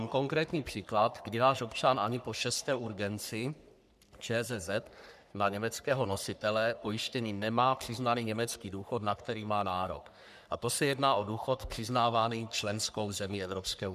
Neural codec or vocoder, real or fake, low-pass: codec, 44.1 kHz, 3.4 kbps, Pupu-Codec; fake; 14.4 kHz